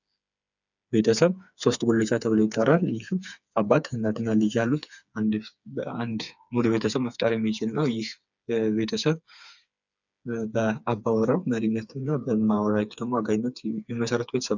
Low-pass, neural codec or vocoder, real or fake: 7.2 kHz; codec, 16 kHz, 4 kbps, FreqCodec, smaller model; fake